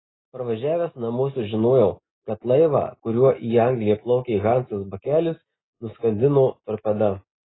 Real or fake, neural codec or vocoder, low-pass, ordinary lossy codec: real; none; 7.2 kHz; AAC, 16 kbps